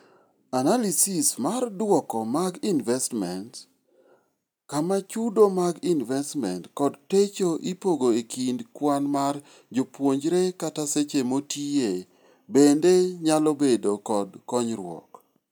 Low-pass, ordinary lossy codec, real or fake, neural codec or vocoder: none; none; real; none